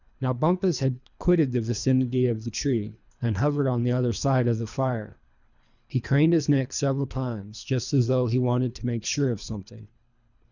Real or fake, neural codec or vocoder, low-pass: fake; codec, 24 kHz, 3 kbps, HILCodec; 7.2 kHz